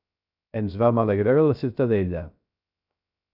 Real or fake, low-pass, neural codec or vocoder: fake; 5.4 kHz; codec, 16 kHz, 0.3 kbps, FocalCodec